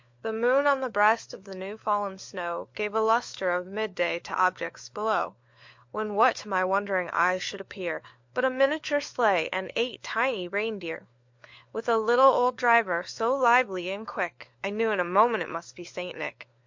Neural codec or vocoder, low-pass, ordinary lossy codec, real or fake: codec, 16 kHz, 4 kbps, FunCodec, trained on LibriTTS, 50 frames a second; 7.2 kHz; MP3, 48 kbps; fake